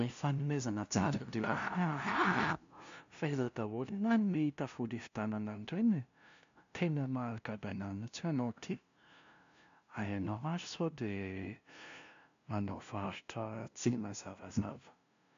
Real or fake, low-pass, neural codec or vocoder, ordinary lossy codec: fake; 7.2 kHz; codec, 16 kHz, 0.5 kbps, FunCodec, trained on LibriTTS, 25 frames a second; MP3, 64 kbps